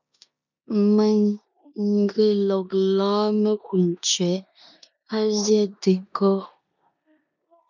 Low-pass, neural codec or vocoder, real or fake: 7.2 kHz; codec, 16 kHz in and 24 kHz out, 0.9 kbps, LongCat-Audio-Codec, four codebook decoder; fake